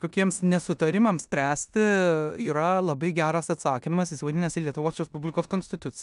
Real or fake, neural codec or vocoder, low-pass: fake; codec, 16 kHz in and 24 kHz out, 0.9 kbps, LongCat-Audio-Codec, four codebook decoder; 10.8 kHz